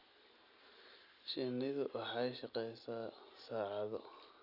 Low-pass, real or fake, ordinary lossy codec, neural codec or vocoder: 5.4 kHz; real; none; none